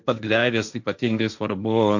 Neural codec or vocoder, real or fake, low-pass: codec, 16 kHz, 1.1 kbps, Voila-Tokenizer; fake; 7.2 kHz